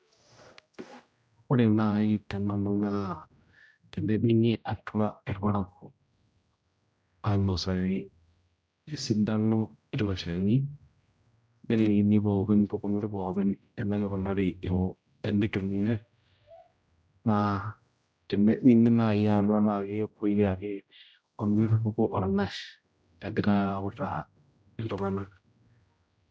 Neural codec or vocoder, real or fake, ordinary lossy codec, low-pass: codec, 16 kHz, 0.5 kbps, X-Codec, HuBERT features, trained on general audio; fake; none; none